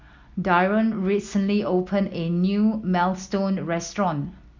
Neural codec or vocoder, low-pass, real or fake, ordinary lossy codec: none; 7.2 kHz; real; MP3, 64 kbps